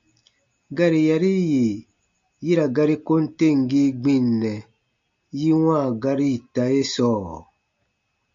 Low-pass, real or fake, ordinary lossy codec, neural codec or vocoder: 7.2 kHz; real; MP3, 48 kbps; none